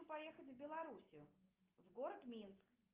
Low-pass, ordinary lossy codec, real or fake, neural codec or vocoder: 3.6 kHz; Opus, 32 kbps; real; none